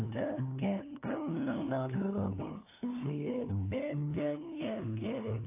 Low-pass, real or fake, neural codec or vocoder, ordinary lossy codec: 3.6 kHz; fake; codec, 16 kHz, 2 kbps, FunCodec, trained on LibriTTS, 25 frames a second; AAC, 24 kbps